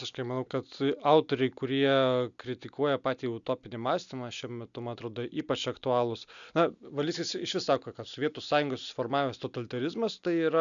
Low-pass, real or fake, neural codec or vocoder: 7.2 kHz; real; none